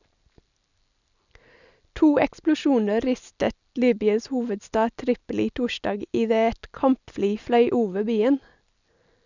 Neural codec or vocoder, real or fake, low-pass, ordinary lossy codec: none; real; 7.2 kHz; none